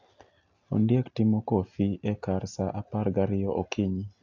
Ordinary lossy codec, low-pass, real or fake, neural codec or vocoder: AAC, 48 kbps; 7.2 kHz; real; none